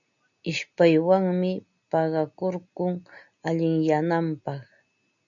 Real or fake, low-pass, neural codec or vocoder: real; 7.2 kHz; none